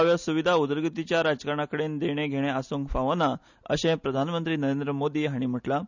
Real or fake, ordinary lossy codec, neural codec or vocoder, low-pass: real; none; none; 7.2 kHz